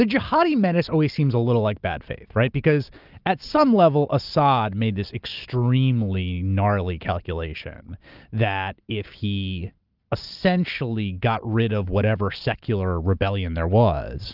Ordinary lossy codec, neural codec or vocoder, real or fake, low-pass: Opus, 24 kbps; none; real; 5.4 kHz